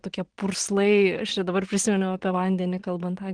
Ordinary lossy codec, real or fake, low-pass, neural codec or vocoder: Opus, 16 kbps; fake; 9.9 kHz; vocoder, 44.1 kHz, 128 mel bands every 512 samples, BigVGAN v2